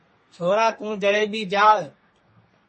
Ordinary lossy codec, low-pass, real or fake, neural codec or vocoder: MP3, 32 kbps; 10.8 kHz; fake; codec, 32 kHz, 1.9 kbps, SNAC